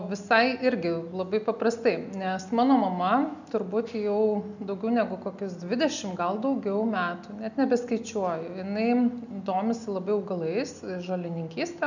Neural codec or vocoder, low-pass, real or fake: none; 7.2 kHz; real